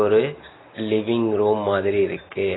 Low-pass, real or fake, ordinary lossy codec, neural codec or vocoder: 7.2 kHz; real; AAC, 16 kbps; none